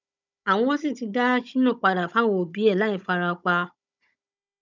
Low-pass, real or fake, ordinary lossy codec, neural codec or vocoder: 7.2 kHz; fake; none; codec, 16 kHz, 16 kbps, FunCodec, trained on Chinese and English, 50 frames a second